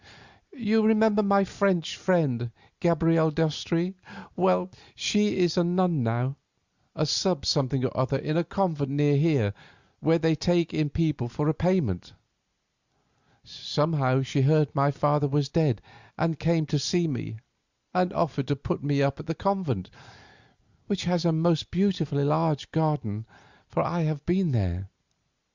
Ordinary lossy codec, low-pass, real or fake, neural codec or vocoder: Opus, 64 kbps; 7.2 kHz; real; none